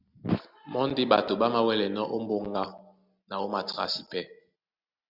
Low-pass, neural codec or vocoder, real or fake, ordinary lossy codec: 5.4 kHz; none; real; Opus, 64 kbps